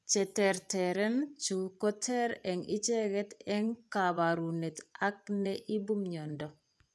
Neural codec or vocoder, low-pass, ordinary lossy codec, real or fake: vocoder, 24 kHz, 100 mel bands, Vocos; none; none; fake